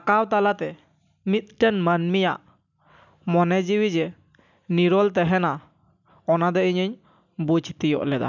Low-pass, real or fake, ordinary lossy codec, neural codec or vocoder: 7.2 kHz; real; none; none